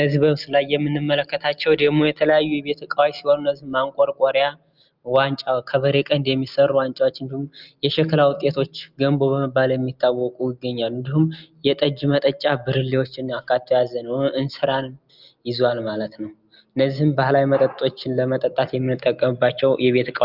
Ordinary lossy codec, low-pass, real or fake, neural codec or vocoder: Opus, 24 kbps; 5.4 kHz; real; none